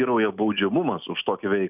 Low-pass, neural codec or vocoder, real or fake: 3.6 kHz; none; real